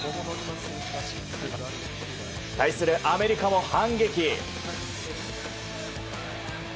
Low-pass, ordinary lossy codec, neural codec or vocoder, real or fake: none; none; none; real